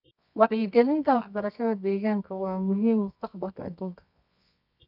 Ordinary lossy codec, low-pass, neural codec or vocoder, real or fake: none; 5.4 kHz; codec, 24 kHz, 0.9 kbps, WavTokenizer, medium music audio release; fake